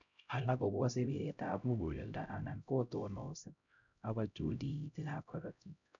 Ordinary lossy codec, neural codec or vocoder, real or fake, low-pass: none; codec, 16 kHz, 0.5 kbps, X-Codec, HuBERT features, trained on LibriSpeech; fake; 7.2 kHz